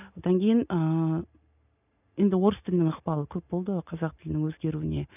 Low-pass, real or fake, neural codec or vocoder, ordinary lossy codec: 3.6 kHz; real; none; none